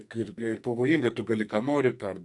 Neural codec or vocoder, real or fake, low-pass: codec, 44.1 kHz, 2.6 kbps, SNAC; fake; 10.8 kHz